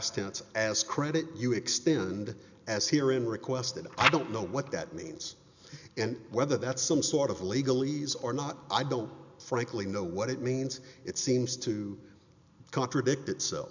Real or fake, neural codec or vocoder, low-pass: real; none; 7.2 kHz